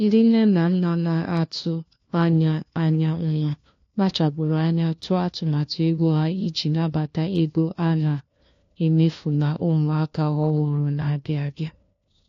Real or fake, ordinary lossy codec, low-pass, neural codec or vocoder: fake; AAC, 48 kbps; 7.2 kHz; codec, 16 kHz, 1 kbps, FunCodec, trained on LibriTTS, 50 frames a second